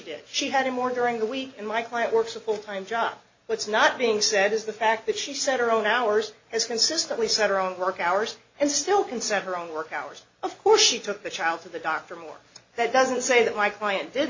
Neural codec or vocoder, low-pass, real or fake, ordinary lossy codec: none; 7.2 kHz; real; MP3, 32 kbps